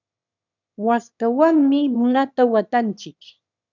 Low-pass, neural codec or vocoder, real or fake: 7.2 kHz; autoencoder, 22.05 kHz, a latent of 192 numbers a frame, VITS, trained on one speaker; fake